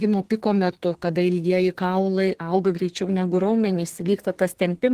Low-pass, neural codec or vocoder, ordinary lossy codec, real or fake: 14.4 kHz; codec, 32 kHz, 1.9 kbps, SNAC; Opus, 16 kbps; fake